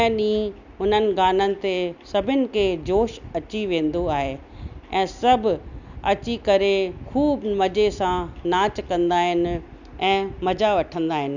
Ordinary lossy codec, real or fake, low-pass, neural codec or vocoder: none; real; 7.2 kHz; none